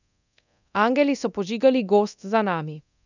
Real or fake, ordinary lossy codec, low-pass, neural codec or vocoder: fake; none; 7.2 kHz; codec, 24 kHz, 0.9 kbps, DualCodec